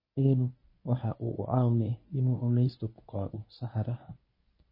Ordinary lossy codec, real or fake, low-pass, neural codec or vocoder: MP3, 24 kbps; fake; 5.4 kHz; codec, 24 kHz, 0.9 kbps, WavTokenizer, medium speech release version 1